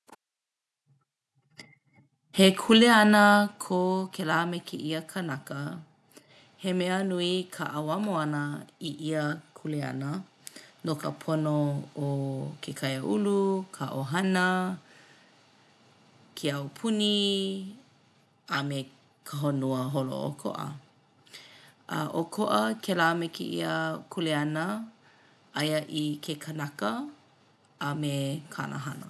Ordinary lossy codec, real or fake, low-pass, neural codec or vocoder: none; real; none; none